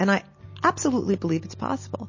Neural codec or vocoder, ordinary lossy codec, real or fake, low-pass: none; MP3, 32 kbps; real; 7.2 kHz